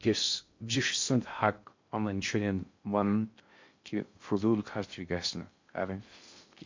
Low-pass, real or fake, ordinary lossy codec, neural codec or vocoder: 7.2 kHz; fake; MP3, 48 kbps; codec, 16 kHz in and 24 kHz out, 0.6 kbps, FocalCodec, streaming, 2048 codes